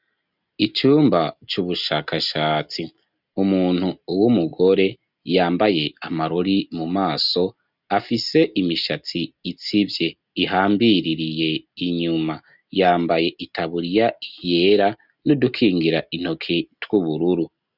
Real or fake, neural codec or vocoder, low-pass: real; none; 5.4 kHz